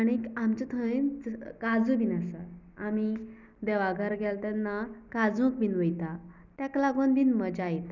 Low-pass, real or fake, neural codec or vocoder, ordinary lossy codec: 7.2 kHz; real; none; none